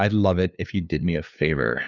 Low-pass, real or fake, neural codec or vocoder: 7.2 kHz; fake; codec, 16 kHz, 2 kbps, FunCodec, trained on LibriTTS, 25 frames a second